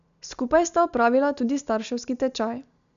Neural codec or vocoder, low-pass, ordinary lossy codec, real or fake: none; 7.2 kHz; none; real